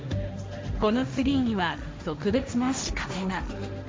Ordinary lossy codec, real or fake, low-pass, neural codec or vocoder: none; fake; none; codec, 16 kHz, 1.1 kbps, Voila-Tokenizer